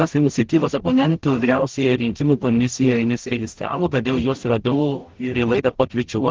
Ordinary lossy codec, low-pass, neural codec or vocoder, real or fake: Opus, 16 kbps; 7.2 kHz; codec, 44.1 kHz, 0.9 kbps, DAC; fake